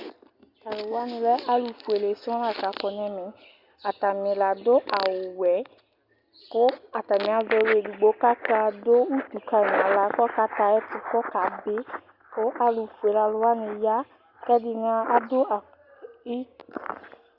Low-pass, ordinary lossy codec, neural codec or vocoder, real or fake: 5.4 kHz; Opus, 64 kbps; none; real